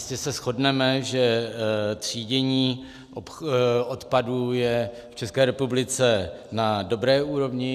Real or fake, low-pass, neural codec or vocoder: real; 14.4 kHz; none